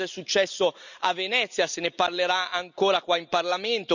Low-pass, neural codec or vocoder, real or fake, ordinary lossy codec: 7.2 kHz; none; real; none